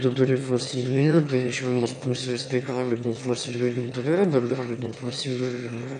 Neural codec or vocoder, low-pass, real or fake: autoencoder, 22.05 kHz, a latent of 192 numbers a frame, VITS, trained on one speaker; 9.9 kHz; fake